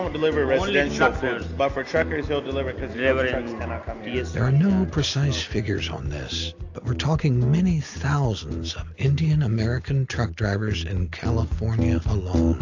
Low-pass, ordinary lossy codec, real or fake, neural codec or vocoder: 7.2 kHz; AAC, 48 kbps; real; none